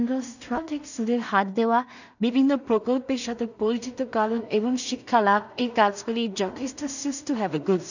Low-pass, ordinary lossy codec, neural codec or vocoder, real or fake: 7.2 kHz; none; codec, 16 kHz in and 24 kHz out, 0.4 kbps, LongCat-Audio-Codec, two codebook decoder; fake